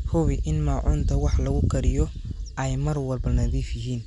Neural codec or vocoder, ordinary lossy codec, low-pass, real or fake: none; none; 10.8 kHz; real